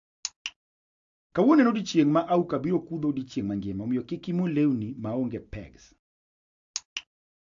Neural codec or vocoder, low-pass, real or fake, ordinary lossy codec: none; 7.2 kHz; real; AAC, 64 kbps